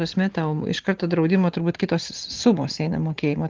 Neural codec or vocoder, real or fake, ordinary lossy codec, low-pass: none; real; Opus, 16 kbps; 7.2 kHz